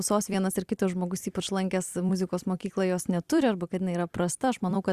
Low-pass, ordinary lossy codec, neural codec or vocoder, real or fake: 14.4 kHz; Opus, 64 kbps; vocoder, 44.1 kHz, 128 mel bands every 256 samples, BigVGAN v2; fake